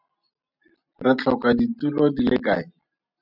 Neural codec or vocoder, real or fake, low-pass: none; real; 5.4 kHz